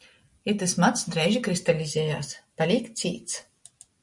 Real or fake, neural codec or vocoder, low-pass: real; none; 10.8 kHz